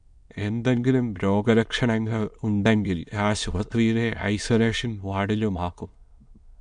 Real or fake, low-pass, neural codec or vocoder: fake; 9.9 kHz; autoencoder, 22.05 kHz, a latent of 192 numbers a frame, VITS, trained on many speakers